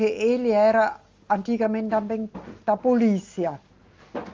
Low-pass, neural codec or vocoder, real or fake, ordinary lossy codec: 7.2 kHz; none; real; Opus, 32 kbps